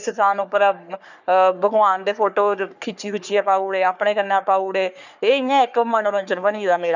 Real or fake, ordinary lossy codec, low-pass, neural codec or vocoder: fake; none; 7.2 kHz; codec, 44.1 kHz, 3.4 kbps, Pupu-Codec